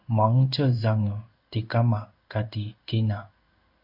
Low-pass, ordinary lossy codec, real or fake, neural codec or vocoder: 5.4 kHz; AAC, 48 kbps; fake; codec, 16 kHz in and 24 kHz out, 1 kbps, XY-Tokenizer